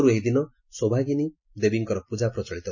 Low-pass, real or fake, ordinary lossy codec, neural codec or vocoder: 7.2 kHz; real; MP3, 32 kbps; none